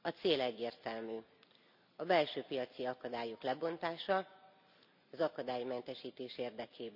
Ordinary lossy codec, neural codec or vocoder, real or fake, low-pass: none; none; real; 5.4 kHz